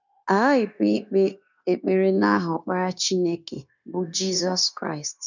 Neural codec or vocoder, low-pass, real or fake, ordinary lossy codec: codec, 16 kHz, 0.9 kbps, LongCat-Audio-Codec; 7.2 kHz; fake; none